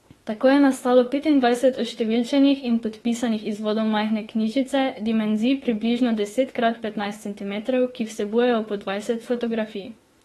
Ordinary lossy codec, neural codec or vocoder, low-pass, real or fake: AAC, 32 kbps; autoencoder, 48 kHz, 32 numbers a frame, DAC-VAE, trained on Japanese speech; 19.8 kHz; fake